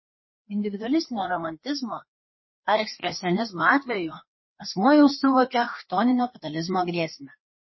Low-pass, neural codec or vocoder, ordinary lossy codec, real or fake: 7.2 kHz; codec, 16 kHz, 2 kbps, FreqCodec, larger model; MP3, 24 kbps; fake